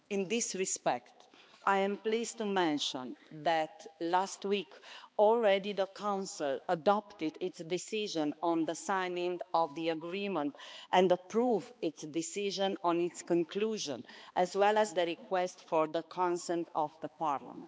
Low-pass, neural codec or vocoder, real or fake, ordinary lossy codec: none; codec, 16 kHz, 2 kbps, X-Codec, HuBERT features, trained on balanced general audio; fake; none